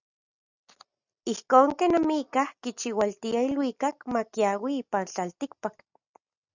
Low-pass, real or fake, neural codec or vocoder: 7.2 kHz; real; none